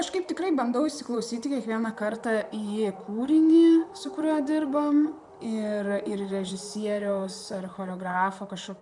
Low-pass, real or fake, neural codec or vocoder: 10.8 kHz; fake; vocoder, 44.1 kHz, 128 mel bands, Pupu-Vocoder